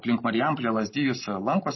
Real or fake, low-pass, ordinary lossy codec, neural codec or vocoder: real; 7.2 kHz; MP3, 24 kbps; none